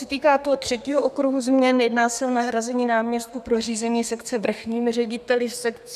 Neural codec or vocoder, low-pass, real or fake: codec, 32 kHz, 1.9 kbps, SNAC; 14.4 kHz; fake